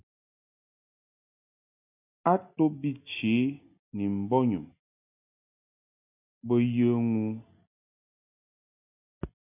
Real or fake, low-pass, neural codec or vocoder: real; 3.6 kHz; none